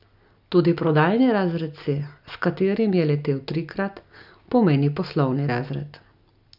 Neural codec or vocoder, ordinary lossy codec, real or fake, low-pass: none; none; real; 5.4 kHz